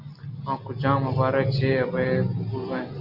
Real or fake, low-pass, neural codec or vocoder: real; 5.4 kHz; none